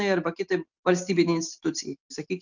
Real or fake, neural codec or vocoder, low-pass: real; none; 7.2 kHz